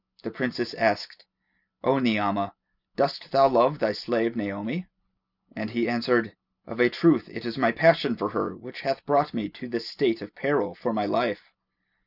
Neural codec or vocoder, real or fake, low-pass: none; real; 5.4 kHz